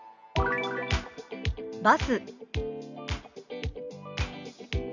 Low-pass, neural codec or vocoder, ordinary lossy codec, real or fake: 7.2 kHz; none; none; real